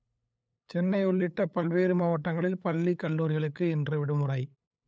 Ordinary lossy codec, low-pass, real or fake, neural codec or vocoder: none; none; fake; codec, 16 kHz, 8 kbps, FunCodec, trained on LibriTTS, 25 frames a second